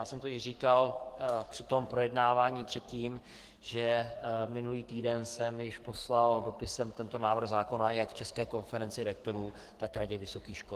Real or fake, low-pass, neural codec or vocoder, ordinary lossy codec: fake; 14.4 kHz; codec, 44.1 kHz, 2.6 kbps, SNAC; Opus, 24 kbps